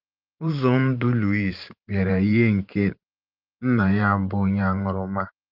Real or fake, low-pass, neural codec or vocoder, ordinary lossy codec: fake; 5.4 kHz; vocoder, 44.1 kHz, 128 mel bands every 512 samples, BigVGAN v2; Opus, 24 kbps